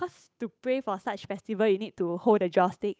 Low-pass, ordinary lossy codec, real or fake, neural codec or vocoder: none; none; fake; codec, 16 kHz, 8 kbps, FunCodec, trained on Chinese and English, 25 frames a second